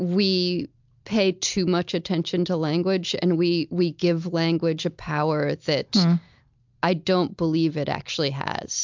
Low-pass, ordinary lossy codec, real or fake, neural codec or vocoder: 7.2 kHz; MP3, 64 kbps; real; none